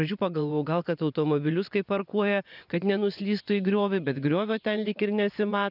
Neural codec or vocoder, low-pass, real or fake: vocoder, 24 kHz, 100 mel bands, Vocos; 5.4 kHz; fake